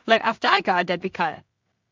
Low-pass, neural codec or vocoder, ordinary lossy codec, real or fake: 7.2 kHz; codec, 16 kHz in and 24 kHz out, 0.4 kbps, LongCat-Audio-Codec, two codebook decoder; MP3, 64 kbps; fake